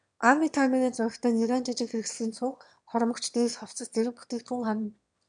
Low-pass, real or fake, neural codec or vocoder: 9.9 kHz; fake; autoencoder, 22.05 kHz, a latent of 192 numbers a frame, VITS, trained on one speaker